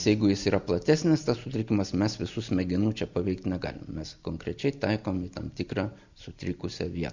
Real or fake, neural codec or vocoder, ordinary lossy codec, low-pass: real; none; Opus, 64 kbps; 7.2 kHz